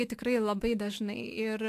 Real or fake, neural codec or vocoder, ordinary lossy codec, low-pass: fake; autoencoder, 48 kHz, 128 numbers a frame, DAC-VAE, trained on Japanese speech; AAC, 64 kbps; 14.4 kHz